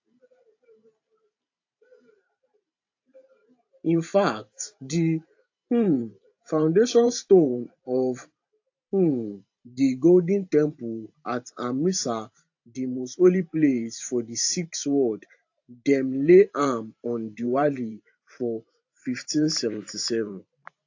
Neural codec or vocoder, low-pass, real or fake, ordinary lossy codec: vocoder, 44.1 kHz, 128 mel bands every 512 samples, BigVGAN v2; 7.2 kHz; fake; AAC, 48 kbps